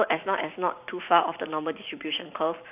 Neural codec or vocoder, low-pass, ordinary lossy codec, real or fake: vocoder, 44.1 kHz, 128 mel bands every 512 samples, BigVGAN v2; 3.6 kHz; AAC, 32 kbps; fake